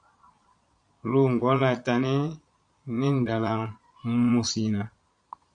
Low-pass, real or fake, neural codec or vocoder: 9.9 kHz; fake; vocoder, 22.05 kHz, 80 mel bands, Vocos